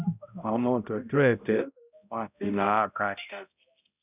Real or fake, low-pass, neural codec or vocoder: fake; 3.6 kHz; codec, 16 kHz, 0.5 kbps, X-Codec, HuBERT features, trained on balanced general audio